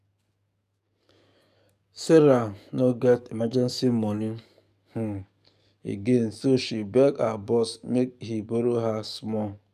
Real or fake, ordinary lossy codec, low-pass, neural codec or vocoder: fake; none; 14.4 kHz; codec, 44.1 kHz, 7.8 kbps, DAC